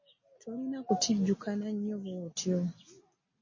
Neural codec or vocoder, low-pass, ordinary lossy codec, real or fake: none; 7.2 kHz; MP3, 32 kbps; real